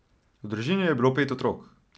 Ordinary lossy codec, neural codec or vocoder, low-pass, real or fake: none; none; none; real